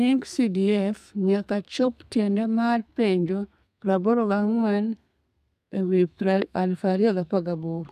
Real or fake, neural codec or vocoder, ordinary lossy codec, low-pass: fake; codec, 32 kHz, 1.9 kbps, SNAC; none; 14.4 kHz